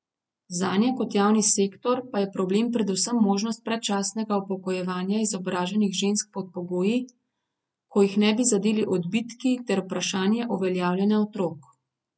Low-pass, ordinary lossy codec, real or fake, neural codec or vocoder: none; none; real; none